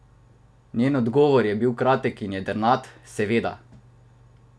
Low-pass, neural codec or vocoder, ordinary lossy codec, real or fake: none; none; none; real